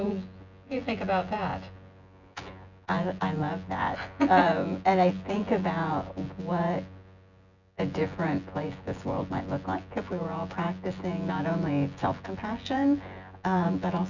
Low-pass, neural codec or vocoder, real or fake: 7.2 kHz; vocoder, 24 kHz, 100 mel bands, Vocos; fake